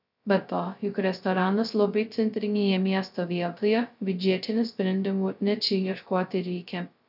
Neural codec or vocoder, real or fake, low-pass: codec, 16 kHz, 0.2 kbps, FocalCodec; fake; 5.4 kHz